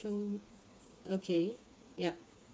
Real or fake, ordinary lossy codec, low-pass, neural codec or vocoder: fake; none; none; codec, 16 kHz, 4 kbps, FreqCodec, smaller model